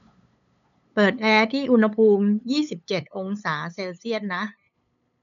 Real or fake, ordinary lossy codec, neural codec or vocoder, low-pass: fake; MP3, 64 kbps; codec, 16 kHz, 8 kbps, FunCodec, trained on LibriTTS, 25 frames a second; 7.2 kHz